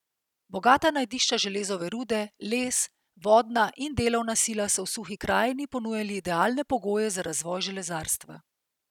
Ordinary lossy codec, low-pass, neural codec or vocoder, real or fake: none; 19.8 kHz; none; real